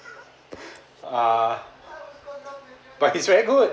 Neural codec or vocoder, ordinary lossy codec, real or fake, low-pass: none; none; real; none